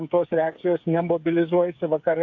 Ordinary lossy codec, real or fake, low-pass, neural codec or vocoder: AAC, 48 kbps; fake; 7.2 kHz; codec, 16 kHz, 8 kbps, FreqCodec, smaller model